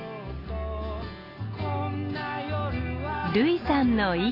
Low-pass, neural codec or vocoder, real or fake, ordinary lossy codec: 5.4 kHz; none; real; AAC, 24 kbps